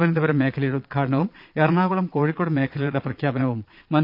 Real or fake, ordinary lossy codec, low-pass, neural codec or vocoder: fake; none; 5.4 kHz; vocoder, 22.05 kHz, 80 mel bands, Vocos